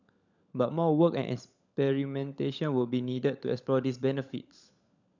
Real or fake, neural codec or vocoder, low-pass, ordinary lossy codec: fake; codec, 16 kHz, 16 kbps, FunCodec, trained on LibriTTS, 50 frames a second; 7.2 kHz; none